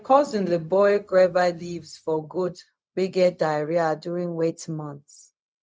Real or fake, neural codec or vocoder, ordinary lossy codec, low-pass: fake; codec, 16 kHz, 0.4 kbps, LongCat-Audio-Codec; none; none